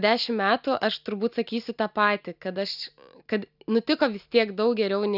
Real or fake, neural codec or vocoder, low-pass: real; none; 5.4 kHz